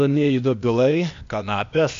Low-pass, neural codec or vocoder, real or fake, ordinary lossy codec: 7.2 kHz; codec, 16 kHz, 0.8 kbps, ZipCodec; fake; AAC, 64 kbps